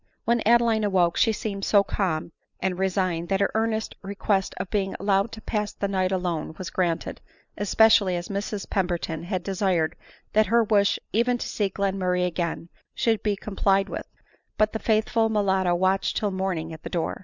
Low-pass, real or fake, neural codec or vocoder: 7.2 kHz; real; none